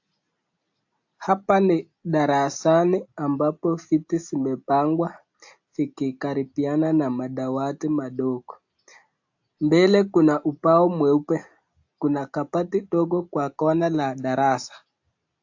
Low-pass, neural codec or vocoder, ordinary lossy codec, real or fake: 7.2 kHz; none; AAC, 48 kbps; real